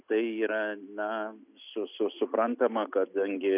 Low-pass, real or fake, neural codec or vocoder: 3.6 kHz; real; none